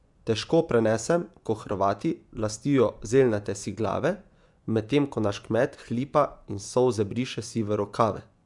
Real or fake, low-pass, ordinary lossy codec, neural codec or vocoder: fake; 10.8 kHz; none; vocoder, 24 kHz, 100 mel bands, Vocos